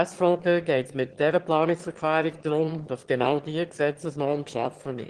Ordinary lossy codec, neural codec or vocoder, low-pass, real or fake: Opus, 16 kbps; autoencoder, 22.05 kHz, a latent of 192 numbers a frame, VITS, trained on one speaker; 9.9 kHz; fake